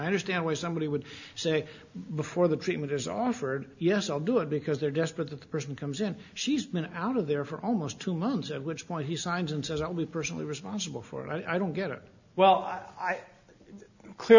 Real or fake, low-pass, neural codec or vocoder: real; 7.2 kHz; none